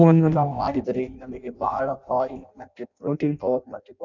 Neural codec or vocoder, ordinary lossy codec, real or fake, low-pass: codec, 16 kHz in and 24 kHz out, 0.6 kbps, FireRedTTS-2 codec; none; fake; 7.2 kHz